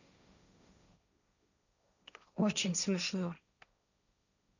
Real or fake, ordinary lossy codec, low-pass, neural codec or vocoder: fake; none; 7.2 kHz; codec, 16 kHz, 1.1 kbps, Voila-Tokenizer